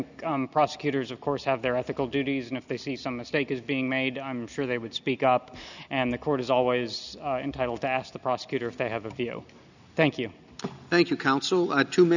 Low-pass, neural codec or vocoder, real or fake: 7.2 kHz; none; real